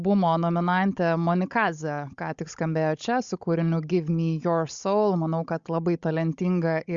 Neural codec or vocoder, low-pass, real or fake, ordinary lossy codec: codec, 16 kHz, 16 kbps, FunCodec, trained on Chinese and English, 50 frames a second; 7.2 kHz; fake; Opus, 64 kbps